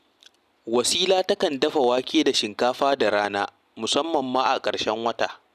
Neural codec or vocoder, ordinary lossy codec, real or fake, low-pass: none; none; real; 14.4 kHz